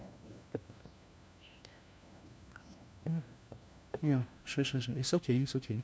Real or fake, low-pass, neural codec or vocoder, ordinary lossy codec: fake; none; codec, 16 kHz, 1 kbps, FunCodec, trained on LibriTTS, 50 frames a second; none